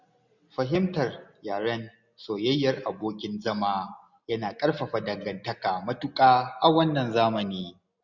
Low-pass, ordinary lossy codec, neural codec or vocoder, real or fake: 7.2 kHz; none; none; real